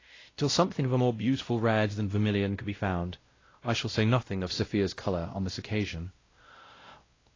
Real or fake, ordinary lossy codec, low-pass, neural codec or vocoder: fake; AAC, 32 kbps; 7.2 kHz; codec, 16 kHz, 0.5 kbps, X-Codec, WavLM features, trained on Multilingual LibriSpeech